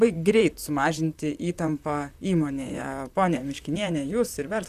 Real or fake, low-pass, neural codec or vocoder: fake; 14.4 kHz; vocoder, 44.1 kHz, 128 mel bands, Pupu-Vocoder